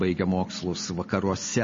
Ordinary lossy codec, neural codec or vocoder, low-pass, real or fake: MP3, 32 kbps; none; 7.2 kHz; real